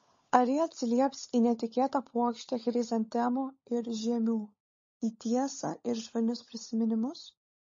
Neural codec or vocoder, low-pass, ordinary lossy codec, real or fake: codec, 16 kHz, 16 kbps, FunCodec, trained on LibriTTS, 50 frames a second; 7.2 kHz; MP3, 32 kbps; fake